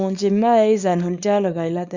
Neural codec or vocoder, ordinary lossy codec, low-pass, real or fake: codec, 24 kHz, 0.9 kbps, WavTokenizer, small release; Opus, 64 kbps; 7.2 kHz; fake